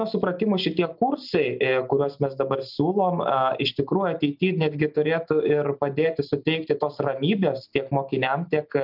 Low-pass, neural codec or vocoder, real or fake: 5.4 kHz; none; real